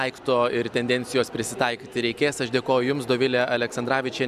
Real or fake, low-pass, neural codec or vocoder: real; 14.4 kHz; none